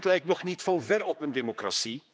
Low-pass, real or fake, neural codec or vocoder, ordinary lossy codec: none; fake; codec, 16 kHz, 2 kbps, X-Codec, HuBERT features, trained on general audio; none